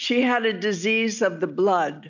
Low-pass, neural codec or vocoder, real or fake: 7.2 kHz; none; real